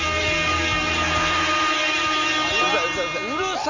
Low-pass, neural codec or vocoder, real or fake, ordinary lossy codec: 7.2 kHz; none; real; none